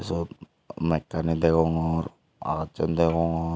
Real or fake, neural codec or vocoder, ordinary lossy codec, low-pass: real; none; none; none